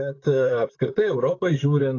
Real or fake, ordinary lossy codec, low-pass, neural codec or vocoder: fake; AAC, 32 kbps; 7.2 kHz; vocoder, 44.1 kHz, 128 mel bands, Pupu-Vocoder